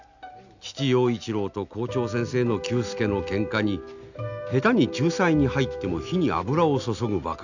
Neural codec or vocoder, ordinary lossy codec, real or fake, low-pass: none; none; real; 7.2 kHz